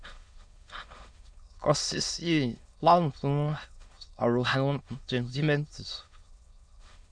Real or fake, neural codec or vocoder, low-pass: fake; autoencoder, 22.05 kHz, a latent of 192 numbers a frame, VITS, trained on many speakers; 9.9 kHz